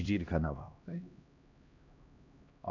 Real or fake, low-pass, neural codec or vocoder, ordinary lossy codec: fake; 7.2 kHz; codec, 16 kHz, 1 kbps, X-Codec, HuBERT features, trained on LibriSpeech; none